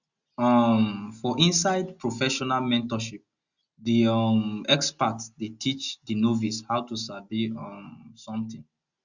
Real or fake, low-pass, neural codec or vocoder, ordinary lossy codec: real; 7.2 kHz; none; Opus, 64 kbps